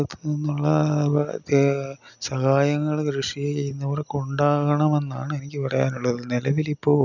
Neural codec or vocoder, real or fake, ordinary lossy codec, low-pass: none; real; none; 7.2 kHz